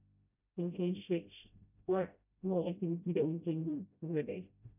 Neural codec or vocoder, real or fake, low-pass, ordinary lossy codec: codec, 16 kHz, 0.5 kbps, FreqCodec, smaller model; fake; 3.6 kHz; none